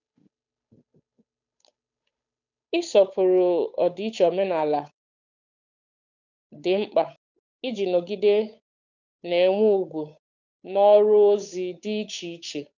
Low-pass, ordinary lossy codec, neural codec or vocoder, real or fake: 7.2 kHz; none; codec, 16 kHz, 8 kbps, FunCodec, trained on Chinese and English, 25 frames a second; fake